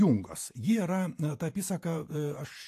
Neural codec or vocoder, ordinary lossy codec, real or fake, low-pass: vocoder, 48 kHz, 128 mel bands, Vocos; AAC, 64 kbps; fake; 14.4 kHz